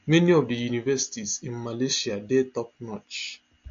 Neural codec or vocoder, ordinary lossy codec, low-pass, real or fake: none; AAC, 48 kbps; 7.2 kHz; real